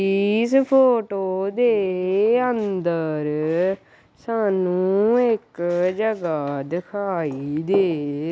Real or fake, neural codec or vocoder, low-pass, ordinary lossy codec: real; none; none; none